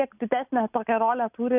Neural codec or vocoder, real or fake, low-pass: none; real; 3.6 kHz